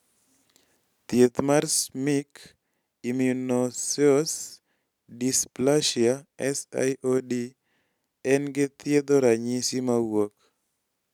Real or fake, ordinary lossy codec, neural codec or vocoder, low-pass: real; none; none; 19.8 kHz